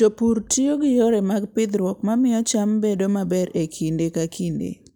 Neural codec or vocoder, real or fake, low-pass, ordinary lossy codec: none; real; none; none